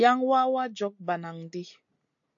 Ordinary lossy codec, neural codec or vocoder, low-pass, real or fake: AAC, 64 kbps; none; 7.2 kHz; real